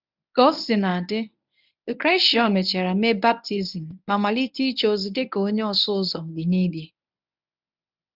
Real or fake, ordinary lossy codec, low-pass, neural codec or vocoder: fake; none; 5.4 kHz; codec, 24 kHz, 0.9 kbps, WavTokenizer, medium speech release version 1